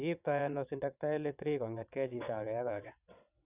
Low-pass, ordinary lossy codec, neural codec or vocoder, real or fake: 3.6 kHz; none; vocoder, 22.05 kHz, 80 mel bands, WaveNeXt; fake